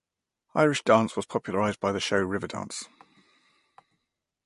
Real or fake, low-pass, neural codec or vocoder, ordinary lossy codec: real; 14.4 kHz; none; MP3, 48 kbps